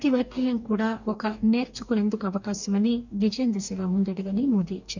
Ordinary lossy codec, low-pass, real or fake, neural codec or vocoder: none; 7.2 kHz; fake; codec, 44.1 kHz, 2.6 kbps, DAC